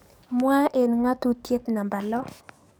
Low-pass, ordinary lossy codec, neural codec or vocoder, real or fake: none; none; codec, 44.1 kHz, 7.8 kbps, DAC; fake